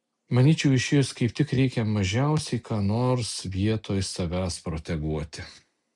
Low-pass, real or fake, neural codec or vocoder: 10.8 kHz; real; none